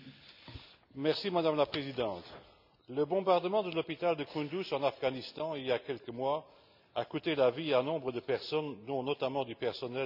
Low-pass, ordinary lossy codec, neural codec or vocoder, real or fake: 5.4 kHz; none; none; real